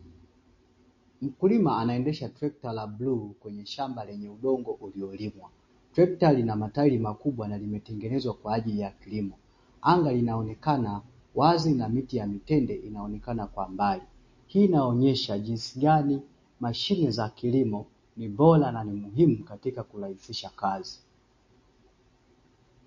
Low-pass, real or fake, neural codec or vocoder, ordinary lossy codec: 7.2 kHz; real; none; MP3, 32 kbps